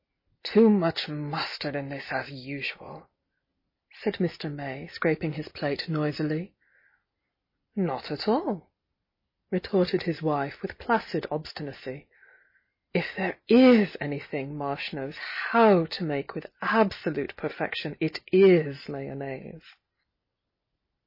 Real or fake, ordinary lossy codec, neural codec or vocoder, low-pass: real; MP3, 24 kbps; none; 5.4 kHz